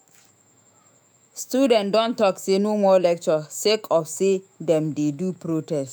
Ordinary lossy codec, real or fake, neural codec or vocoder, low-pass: none; fake; autoencoder, 48 kHz, 128 numbers a frame, DAC-VAE, trained on Japanese speech; none